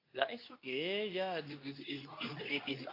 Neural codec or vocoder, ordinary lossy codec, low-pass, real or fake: codec, 24 kHz, 0.9 kbps, WavTokenizer, medium speech release version 2; AAC, 32 kbps; 5.4 kHz; fake